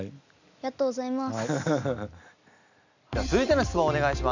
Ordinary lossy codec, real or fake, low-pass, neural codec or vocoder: none; real; 7.2 kHz; none